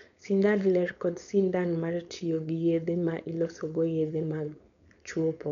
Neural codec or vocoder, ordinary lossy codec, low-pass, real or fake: codec, 16 kHz, 4.8 kbps, FACodec; none; 7.2 kHz; fake